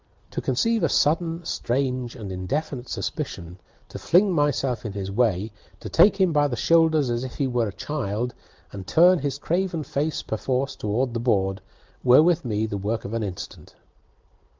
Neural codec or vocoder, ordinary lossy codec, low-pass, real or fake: none; Opus, 32 kbps; 7.2 kHz; real